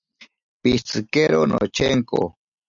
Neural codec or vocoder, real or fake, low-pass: none; real; 7.2 kHz